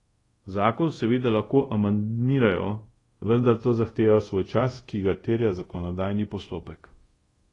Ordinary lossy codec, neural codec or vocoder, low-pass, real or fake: AAC, 32 kbps; codec, 24 kHz, 0.5 kbps, DualCodec; 10.8 kHz; fake